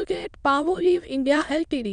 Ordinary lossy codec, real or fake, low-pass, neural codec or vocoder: none; fake; 9.9 kHz; autoencoder, 22.05 kHz, a latent of 192 numbers a frame, VITS, trained on many speakers